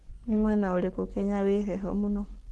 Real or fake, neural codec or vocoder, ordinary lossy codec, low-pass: fake; codec, 44.1 kHz, 7.8 kbps, Pupu-Codec; Opus, 16 kbps; 10.8 kHz